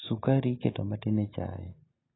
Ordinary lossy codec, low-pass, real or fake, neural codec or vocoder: AAC, 16 kbps; 7.2 kHz; real; none